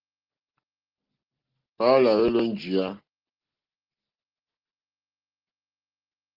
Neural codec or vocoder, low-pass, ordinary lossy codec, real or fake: none; 5.4 kHz; Opus, 16 kbps; real